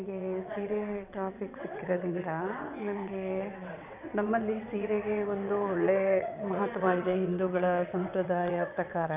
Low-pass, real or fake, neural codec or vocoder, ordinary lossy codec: 3.6 kHz; fake; vocoder, 22.05 kHz, 80 mel bands, WaveNeXt; none